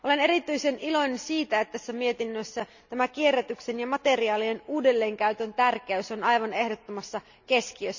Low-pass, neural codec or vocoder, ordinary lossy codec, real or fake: 7.2 kHz; none; none; real